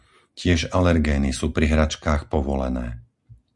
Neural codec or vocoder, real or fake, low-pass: none; real; 10.8 kHz